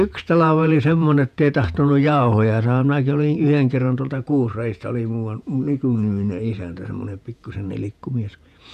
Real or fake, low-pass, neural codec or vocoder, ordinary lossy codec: fake; 14.4 kHz; vocoder, 48 kHz, 128 mel bands, Vocos; none